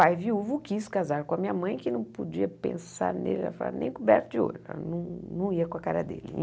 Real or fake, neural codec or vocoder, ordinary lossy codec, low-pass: real; none; none; none